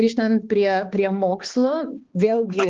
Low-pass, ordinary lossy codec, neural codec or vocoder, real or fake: 7.2 kHz; Opus, 16 kbps; codec, 16 kHz, 2 kbps, X-Codec, HuBERT features, trained on balanced general audio; fake